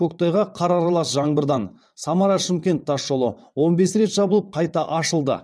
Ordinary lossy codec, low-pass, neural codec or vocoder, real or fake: none; none; vocoder, 22.05 kHz, 80 mel bands, WaveNeXt; fake